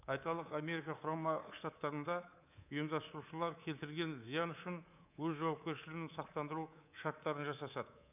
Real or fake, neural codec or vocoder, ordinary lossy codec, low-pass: fake; codec, 24 kHz, 3.1 kbps, DualCodec; none; 3.6 kHz